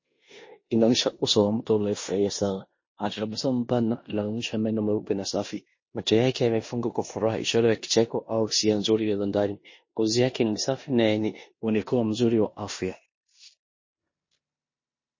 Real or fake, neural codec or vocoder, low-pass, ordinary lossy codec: fake; codec, 16 kHz in and 24 kHz out, 0.9 kbps, LongCat-Audio-Codec, four codebook decoder; 7.2 kHz; MP3, 32 kbps